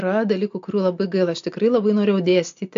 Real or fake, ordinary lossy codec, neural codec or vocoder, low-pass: real; AAC, 64 kbps; none; 7.2 kHz